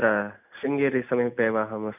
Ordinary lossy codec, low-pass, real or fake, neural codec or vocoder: none; 3.6 kHz; real; none